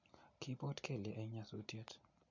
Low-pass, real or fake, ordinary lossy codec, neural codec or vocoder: 7.2 kHz; real; none; none